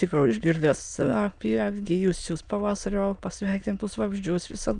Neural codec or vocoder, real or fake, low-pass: autoencoder, 22.05 kHz, a latent of 192 numbers a frame, VITS, trained on many speakers; fake; 9.9 kHz